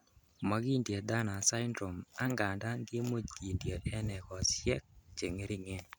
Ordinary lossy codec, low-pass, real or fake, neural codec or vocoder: none; none; real; none